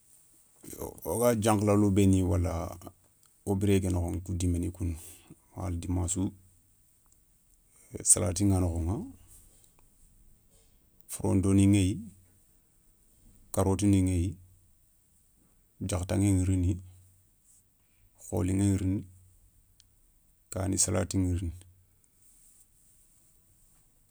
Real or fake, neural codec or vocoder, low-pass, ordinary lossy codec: real; none; none; none